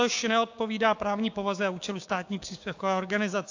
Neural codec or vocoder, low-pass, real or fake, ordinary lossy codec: codec, 16 kHz, 6 kbps, DAC; 7.2 kHz; fake; AAC, 48 kbps